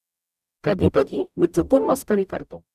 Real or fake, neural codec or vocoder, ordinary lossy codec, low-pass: fake; codec, 44.1 kHz, 0.9 kbps, DAC; none; 14.4 kHz